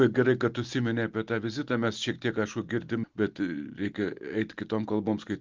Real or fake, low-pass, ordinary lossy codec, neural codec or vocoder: real; 7.2 kHz; Opus, 32 kbps; none